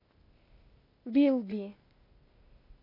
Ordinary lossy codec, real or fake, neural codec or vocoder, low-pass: MP3, 32 kbps; fake; codec, 16 kHz, 0.8 kbps, ZipCodec; 5.4 kHz